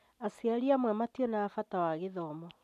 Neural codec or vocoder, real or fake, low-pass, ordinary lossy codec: none; real; 14.4 kHz; none